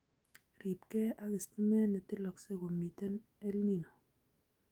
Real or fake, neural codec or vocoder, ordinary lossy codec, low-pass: fake; autoencoder, 48 kHz, 128 numbers a frame, DAC-VAE, trained on Japanese speech; Opus, 24 kbps; 19.8 kHz